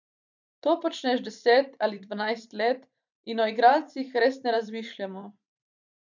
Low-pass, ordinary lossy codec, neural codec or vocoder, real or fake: 7.2 kHz; none; vocoder, 44.1 kHz, 128 mel bands every 512 samples, BigVGAN v2; fake